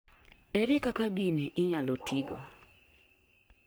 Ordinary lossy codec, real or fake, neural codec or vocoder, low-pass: none; fake; codec, 44.1 kHz, 3.4 kbps, Pupu-Codec; none